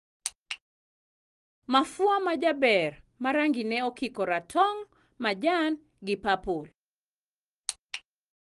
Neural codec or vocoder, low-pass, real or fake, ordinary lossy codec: none; 10.8 kHz; real; Opus, 24 kbps